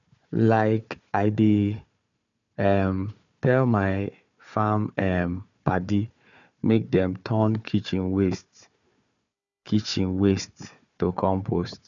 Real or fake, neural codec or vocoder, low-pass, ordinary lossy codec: fake; codec, 16 kHz, 4 kbps, FunCodec, trained on Chinese and English, 50 frames a second; 7.2 kHz; none